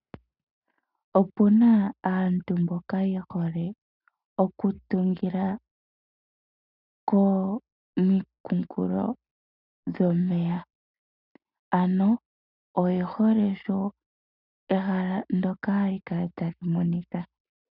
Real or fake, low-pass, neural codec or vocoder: real; 5.4 kHz; none